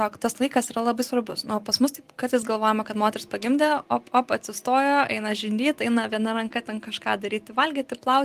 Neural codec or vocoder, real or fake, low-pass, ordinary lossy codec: none; real; 14.4 kHz; Opus, 24 kbps